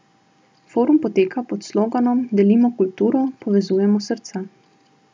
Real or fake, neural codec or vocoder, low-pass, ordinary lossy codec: real; none; none; none